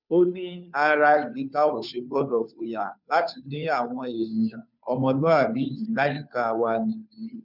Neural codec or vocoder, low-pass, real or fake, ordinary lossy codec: codec, 16 kHz, 2 kbps, FunCodec, trained on Chinese and English, 25 frames a second; 5.4 kHz; fake; none